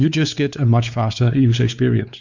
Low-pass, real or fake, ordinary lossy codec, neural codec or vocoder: 7.2 kHz; fake; Opus, 64 kbps; codec, 16 kHz, 4 kbps, X-Codec, WavLM features, trained on Multilingual LibriSpeech